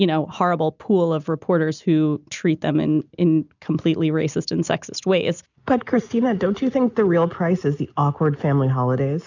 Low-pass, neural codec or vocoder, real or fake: 7.2 kHz; none; real